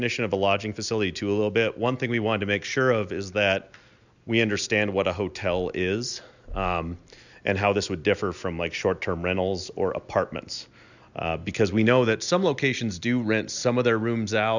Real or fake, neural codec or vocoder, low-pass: real; none; 7.2 kHz